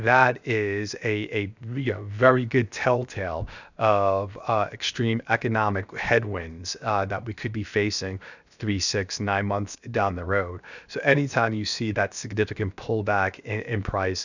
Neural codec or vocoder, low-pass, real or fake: codec, 16 kHz, 0.7 kbps, FocalCodec; 7.2 kHz; fake